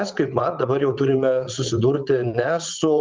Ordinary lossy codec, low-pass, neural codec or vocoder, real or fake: Opus, 32 kbps; 7.2 kHz; vocoder, 22.05 kHz, 80 mel bands, WaveNeXt; fake